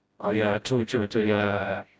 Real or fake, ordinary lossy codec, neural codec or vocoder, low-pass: fake; none; codec, 16 kHz, 0.5 kbps, FreqCodec, smaller model; none